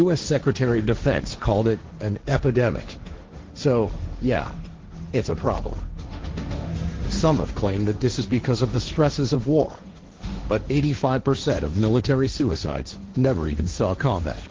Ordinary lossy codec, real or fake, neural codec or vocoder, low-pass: Opus, 16 kbps; fake; codec, 16 kHz, 1.1 kbps, Voila-Tokenizer; 7.2 kHz